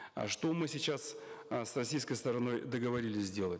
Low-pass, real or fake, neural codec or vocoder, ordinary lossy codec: none; real; none; none